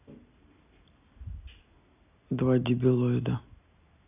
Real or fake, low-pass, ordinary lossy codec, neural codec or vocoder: real; 3.6 kHz; none; none